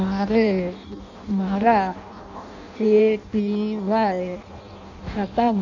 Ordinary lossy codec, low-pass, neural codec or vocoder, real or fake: none; 7.2 kHz; codec, 16 kHz in and 24 kHz out, 0.6 kbps, FireRedTTS-2 codec; fake